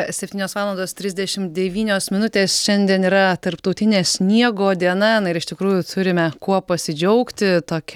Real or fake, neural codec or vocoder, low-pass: real; none; 19.8 kHz